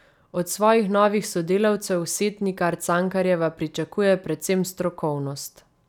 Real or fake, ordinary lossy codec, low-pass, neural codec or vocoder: real; none; 19.8 kHz; none